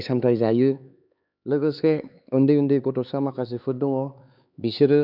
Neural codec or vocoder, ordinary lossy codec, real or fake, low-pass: codec, 16 kHz, 4 kbps, X-Codec, HuBERT features, trained on LibriSpeech; none; fake; 5.4 kHz